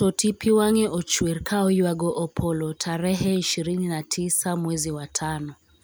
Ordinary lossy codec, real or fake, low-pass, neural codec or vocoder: none; real; none; none